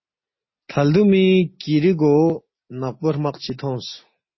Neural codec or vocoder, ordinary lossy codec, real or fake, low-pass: none; MP3, 24 kbps; real; 7.2 kHz